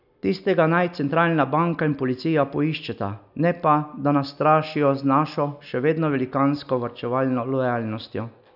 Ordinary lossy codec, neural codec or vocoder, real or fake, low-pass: none; none; real; 5.4 kHz